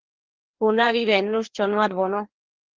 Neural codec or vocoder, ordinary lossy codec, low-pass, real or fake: codec, 16 kHz, 2 kbps, FreqCodec, larger model; Opus, 16 kbps; 7.2 kHz; fake